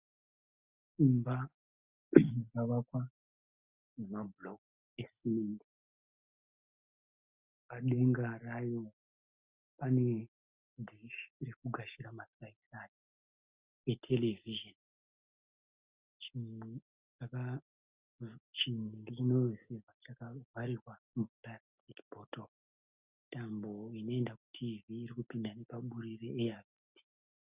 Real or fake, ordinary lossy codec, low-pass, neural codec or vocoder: real; Opus, 64 kbps; 3.6 kHz; none